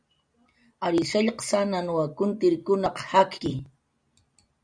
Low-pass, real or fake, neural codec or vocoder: 9.9 kHz; real; none